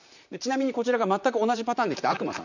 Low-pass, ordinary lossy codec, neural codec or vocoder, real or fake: 7.2 kHz; none; vocoder, 44.1 kHz, 128 mel bands, Pupu-Vocoder; fake